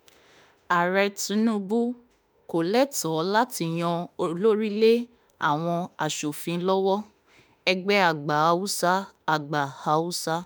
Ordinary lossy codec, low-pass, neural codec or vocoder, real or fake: none; none; autoencoder, 48 kHz, 32 numbers a frame, DAC-VAE, trained on Japanese speech; fake